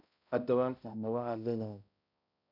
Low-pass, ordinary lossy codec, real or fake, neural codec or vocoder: 5.4 kHz; none; fake; codec, 16 kHz, 0.5 kbps, X-Codec, HuBERT features, trained on balanced general audio